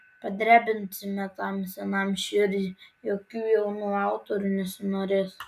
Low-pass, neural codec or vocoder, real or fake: 14.4 kHz; none; real